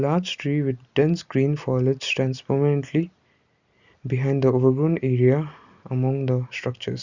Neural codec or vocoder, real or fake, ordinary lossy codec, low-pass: none; real; Opus, 64 kbps; 7.2 kHz